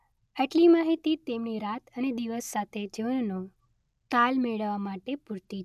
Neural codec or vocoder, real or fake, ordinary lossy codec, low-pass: none; real; none; 14.4 kHz